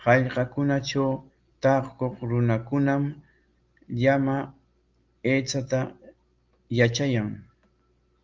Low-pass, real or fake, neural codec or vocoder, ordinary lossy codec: 7.2 kHz; real; none; Opus, 32 kbps